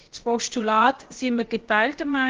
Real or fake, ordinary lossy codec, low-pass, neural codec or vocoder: fake; Opus, 16 kbps; 7.2 kHz; codec, 16 kHz, about 1 kbps, DyCAST, with the encoder's durations